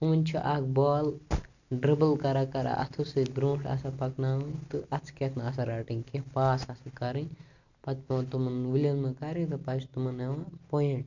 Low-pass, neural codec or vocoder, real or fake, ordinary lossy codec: 7.2 kHz; none; real; none